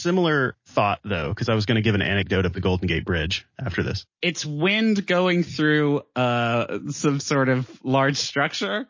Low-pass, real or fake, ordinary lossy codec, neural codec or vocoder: 7.2 kHz; real; MP3, 32 kbps; none